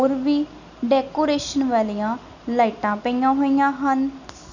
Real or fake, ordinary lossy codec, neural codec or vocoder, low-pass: real; none; none; 7.2 kHz